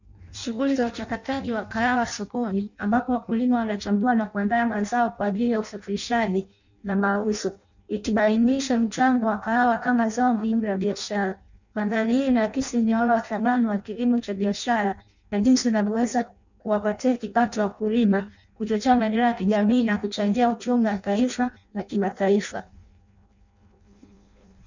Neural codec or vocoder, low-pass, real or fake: codec, 16 kHz in and 24 kHz out, 0.6 kbps, FireRedTTS-2 codec; 7.2 kHz; fake